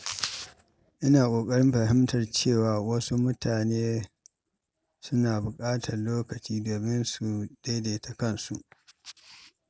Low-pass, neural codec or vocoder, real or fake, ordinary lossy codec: none; none; real; none